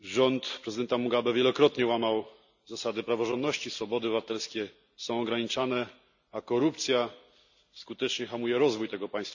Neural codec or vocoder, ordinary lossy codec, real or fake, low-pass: none; none; real; 7.2 kHz